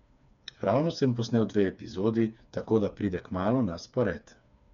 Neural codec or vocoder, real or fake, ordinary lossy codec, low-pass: codec, 16 kHz, 4 kbps, FreqCodec, smaller model; fake; none; 7.2 kHz